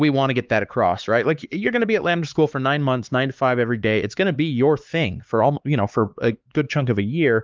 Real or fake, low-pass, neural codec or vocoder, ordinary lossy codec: fake; 7.2 kHz; codec, 16 kHz, 4 kbps, X-Codec, HuBERT features, trained on LibriSpeech; Opus, 32 kbps